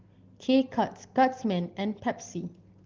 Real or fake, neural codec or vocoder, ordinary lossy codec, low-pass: real; none; Opus, 16 kbps; 7.2 kHz